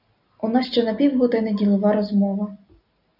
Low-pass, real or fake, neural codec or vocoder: 5.4 kHz; real; none